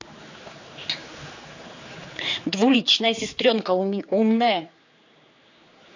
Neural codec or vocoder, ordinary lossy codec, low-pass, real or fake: codec, 16 kHz, 4 kbps, X-Codec, HuBERT features, trained on general audio; AAC, 48 kbps; 7.2 kHz; fake